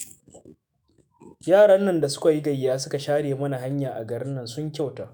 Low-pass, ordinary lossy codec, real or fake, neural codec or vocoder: none; none; fake; autoencoder, 48 kHz, 128 numbers a frame, DAC-VAE, trained on Japanese speech